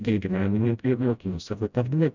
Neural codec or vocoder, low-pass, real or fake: codec, 16 kHz, 0.5 kbps, FreqCodec, smaller model; 7.2 kHz; fake